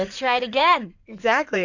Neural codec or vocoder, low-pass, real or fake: codec, 16 kHz in and 24 kHz out, 2.2 kbps, FireRedTTS-2 codec; 7.2 kHz; fake